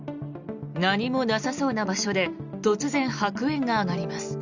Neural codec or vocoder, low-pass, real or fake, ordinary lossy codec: none; 7.2 kHz; real; Opus, 64 kbps